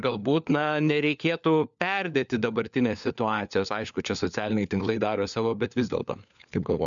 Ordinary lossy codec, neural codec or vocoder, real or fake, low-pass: MP3, 96 kbps; codec, 16 kHz, 4 kbps, FunCodec, trained on LibriTTS, 50 frames a second; fake; 7.2 kHz